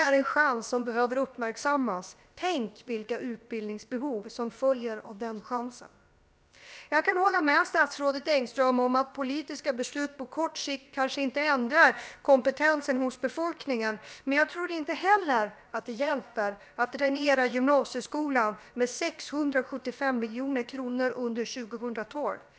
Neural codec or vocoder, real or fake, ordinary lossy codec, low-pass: codec, 16 kHz, about 1 kbps, DyCAST, with the encoder's durations; fake; none; none